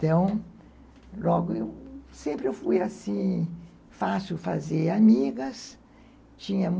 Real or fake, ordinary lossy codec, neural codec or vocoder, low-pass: real; none; none; none